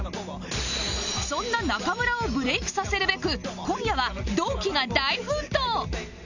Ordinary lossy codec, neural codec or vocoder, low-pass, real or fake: none; none; 7.2 kHz; real